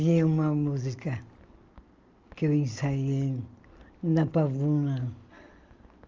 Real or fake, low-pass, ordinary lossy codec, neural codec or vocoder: real; 7.2 kHz; Opus, 32 kbps; none